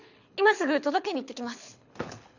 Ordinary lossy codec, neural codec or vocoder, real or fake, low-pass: none; codec, 24 kHz, 6 kbps, HILCodec; fake; 7.2 kHz